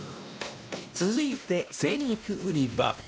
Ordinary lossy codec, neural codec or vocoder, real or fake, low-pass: none; codec, 16 kHz, 1 kbps, X-Codec, WavLM features, trained on Multilingual LibriSpeech; fake; none